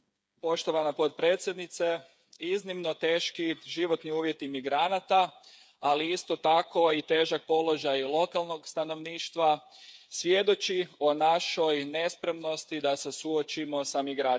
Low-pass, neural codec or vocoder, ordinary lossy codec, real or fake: none; codec, 16 kHz, 8 kbps, FreqCodec, smaller model; none; fake